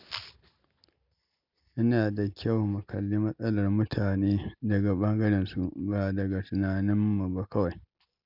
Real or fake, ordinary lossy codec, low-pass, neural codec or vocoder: real; none; 5.4 kHz; none